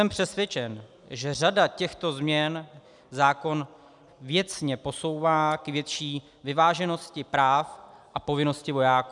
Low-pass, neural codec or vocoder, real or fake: 10.8 kHz; none; real